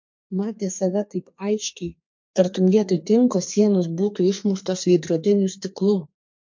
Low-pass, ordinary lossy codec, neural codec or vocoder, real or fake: 7.2 kHz; MP3, 48 kbps; codec, 32 kHz, 1.9 kbps, SNAC; fake